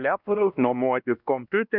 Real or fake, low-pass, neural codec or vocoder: fake; 5.4 kHz; codec, 16 kHz, 1 kbps, X-Codec, WavLM features, trained on Multilingual LibriSpeech